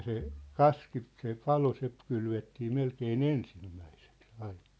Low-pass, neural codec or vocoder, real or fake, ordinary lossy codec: none; none; real; none